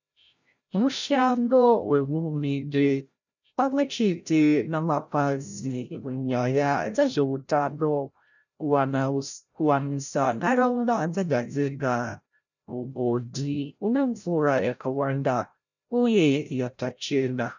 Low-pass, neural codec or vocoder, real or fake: 7.2 kHz; codec, 16 kHz, 0.5 kbps, FreqCodec, larger model; fake